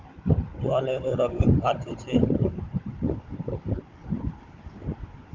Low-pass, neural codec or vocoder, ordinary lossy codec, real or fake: 7.2 kHz; codec, 16 kHz, 16 kbps, FunCodec, trained on Chinese and English, 50 frames a second; Opus, 24 kbps; fake